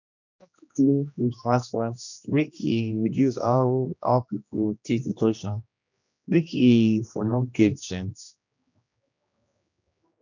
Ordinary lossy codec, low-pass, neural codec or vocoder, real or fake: none; 7.2 kHz; codec, 16 kHz, 1 kbps, X-Codec, HuBERT features, trained on general audio; fake